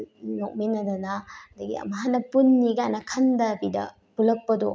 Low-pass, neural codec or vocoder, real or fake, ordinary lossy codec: none; none; real; none